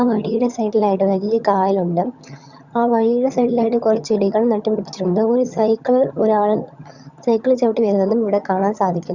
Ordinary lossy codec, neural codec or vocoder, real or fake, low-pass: Opus, 64 kbps; vocoder, 22.05 kHz, 80 mel bands, HiFi-GAN; fake; 7.2 kHz